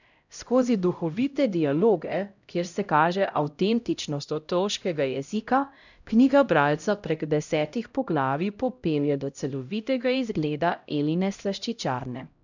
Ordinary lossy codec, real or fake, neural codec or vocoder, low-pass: none; fake; codec, 16 kHz, 0.5 kbps, X-Codec, HuBERT features, trained on LibriSpeech; 7.2 kHz